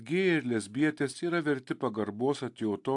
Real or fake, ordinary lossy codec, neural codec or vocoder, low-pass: real; AAC, 64 kbps; none; 10.8 kHz